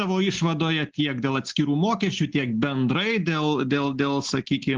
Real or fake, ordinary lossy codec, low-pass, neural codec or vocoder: real; Opus, 16 kbps; 7.2 kHz; none